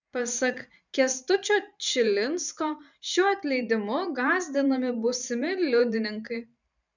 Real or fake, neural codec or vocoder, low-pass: fake; vocoder, 44.1 kHz, 128 mel bands every 512 samples, BigVGAN v2; 7.2 kHz